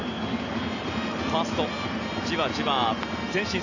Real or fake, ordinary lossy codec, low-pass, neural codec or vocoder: real; none; 7.2 kHz; none